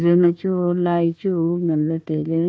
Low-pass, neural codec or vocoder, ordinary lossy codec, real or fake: none; codec, 16 kHz, 1 kbps, FunCodec, trained on Chinese and English, 50 frames a second; none; fake